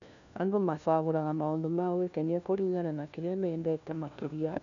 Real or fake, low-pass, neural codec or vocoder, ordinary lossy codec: fake; 7.2 kHz; codec, 16 kHz, 1 kbps, FunCodec, trained on LibriTTS, 50 frames a second; none